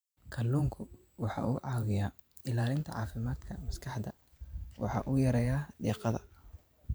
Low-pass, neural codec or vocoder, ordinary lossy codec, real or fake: none; vocoder, 44.1 kHz, 128 mel bands every 512 samples, BigVGAN v2; none; fake